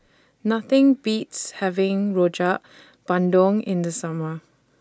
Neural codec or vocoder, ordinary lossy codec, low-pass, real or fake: none; none; none; real